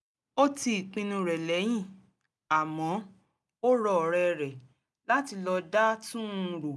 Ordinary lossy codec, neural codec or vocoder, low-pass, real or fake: none; none; none; real